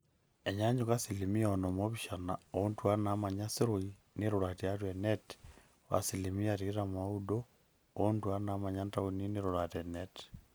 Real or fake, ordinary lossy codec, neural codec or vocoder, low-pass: real; none; none; none